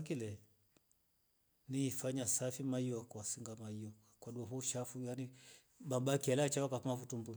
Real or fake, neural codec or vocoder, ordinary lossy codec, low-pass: real; none; none; none